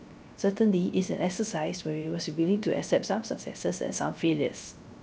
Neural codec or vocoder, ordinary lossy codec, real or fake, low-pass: codec, 16 kHz, 0.3 kbps, FocalCodec; none; fake; none